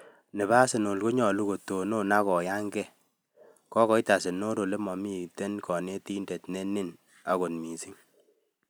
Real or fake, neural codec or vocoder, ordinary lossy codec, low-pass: real; none; none; none